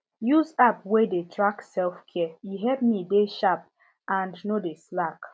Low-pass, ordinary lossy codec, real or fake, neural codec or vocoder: none; none; real; none